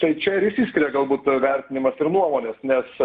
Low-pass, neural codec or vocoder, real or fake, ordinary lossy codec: 9.9 kHz; none; real; Opus, 32 kbps